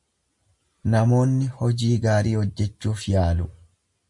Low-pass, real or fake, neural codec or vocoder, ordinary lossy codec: 10.8 kHz; real; none; MP3, 64 kbps